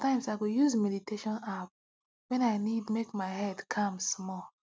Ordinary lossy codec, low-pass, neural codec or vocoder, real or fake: none; none; none; real